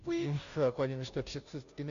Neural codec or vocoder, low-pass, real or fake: codec, 16 kHz, 0.5 kbps, FunCodec, trained on Chinese and English, 25 frames a second; 7.2 kHz; fake